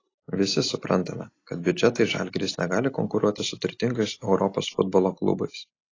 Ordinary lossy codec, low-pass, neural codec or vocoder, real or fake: AAC, 32 kbps; 7.2 kHz; none; real